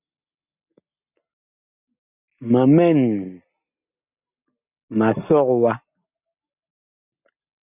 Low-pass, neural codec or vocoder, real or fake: 3.6 kHz; none; real